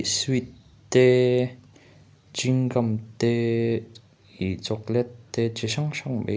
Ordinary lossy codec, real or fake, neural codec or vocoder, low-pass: none; real; none; none